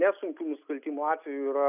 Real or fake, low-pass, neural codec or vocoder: real; 3.6 kHz; none